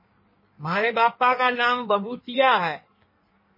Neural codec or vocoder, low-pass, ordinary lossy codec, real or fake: codec, 16 kHz in and 24 kHz out, 1.1 kbps, FireRedTTS-2 codec; 5.4 kHz; MP3, 24 kbps; fake